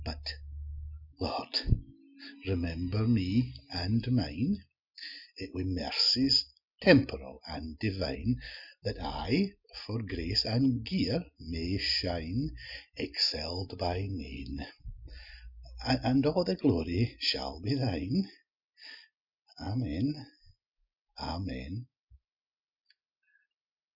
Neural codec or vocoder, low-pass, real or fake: none; 5.4 kHz; real